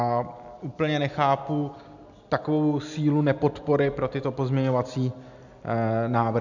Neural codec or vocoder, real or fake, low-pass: none; real; 7.2 kHz